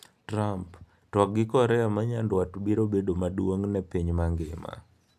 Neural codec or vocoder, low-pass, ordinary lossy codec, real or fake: none; 14.4 kHz; none; real